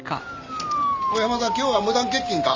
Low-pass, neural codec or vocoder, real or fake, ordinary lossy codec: 7.2 kHz; none; real; Opus, 32 kbps